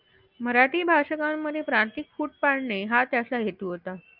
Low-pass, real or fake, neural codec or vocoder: 5.4 kHz; real; none